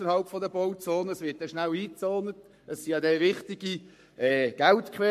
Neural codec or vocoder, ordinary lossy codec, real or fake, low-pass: codec, 44.1 kHz, 7.8 kbps, Pupu-Codec; MP3, 64 kbps; fake; 14.4 kHz